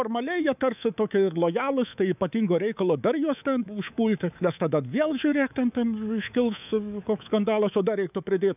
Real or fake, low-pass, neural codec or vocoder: fake; 3.6 kHz; codec, 24 kHz, 3.1 kbps, DualCodec